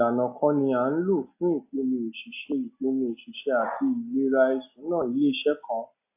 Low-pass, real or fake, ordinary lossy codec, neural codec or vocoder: 3.6 kHz; real; AAC, 32 kbps; none